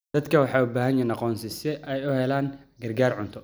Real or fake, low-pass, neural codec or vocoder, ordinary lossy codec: real; none; none; none